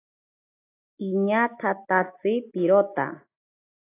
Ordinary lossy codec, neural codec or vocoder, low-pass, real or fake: AAC, 24 kbps; none; 3.6 kHz; real